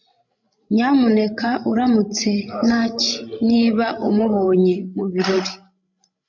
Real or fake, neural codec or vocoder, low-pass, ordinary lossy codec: fake; codec, 16 kHz, 16 kbps, FreqCodec, larger model; 7.2 kHz; MP3, 64 kbps